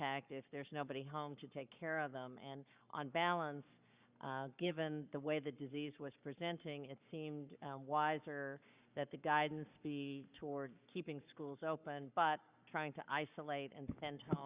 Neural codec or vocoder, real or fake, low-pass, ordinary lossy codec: codec, 44.1 kHz, 7.8 kbps, Pupu-Codec; fake; 3.6 kHz; Opus, 64 kbps